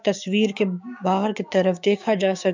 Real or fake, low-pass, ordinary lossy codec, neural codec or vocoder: fake; 7.2 kHz; MP3, 64 kbps; codec, 16 kHz, 6 kbps, DAC